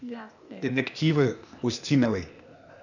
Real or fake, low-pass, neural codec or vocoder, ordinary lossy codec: fake; 7.2 kHz; codec, 16 kHz, 0.8 kbps, ZipCodec; none